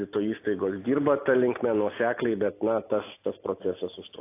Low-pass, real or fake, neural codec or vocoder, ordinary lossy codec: 3.6 kHz; real; none; AAC, 24 kbps